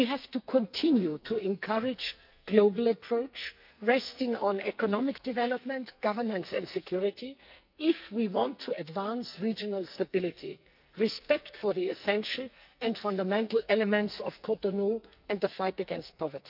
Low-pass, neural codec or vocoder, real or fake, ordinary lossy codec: 5.4 kHz; codec, 44.1 kHz, 2.6 kbps, SNAC; fake; none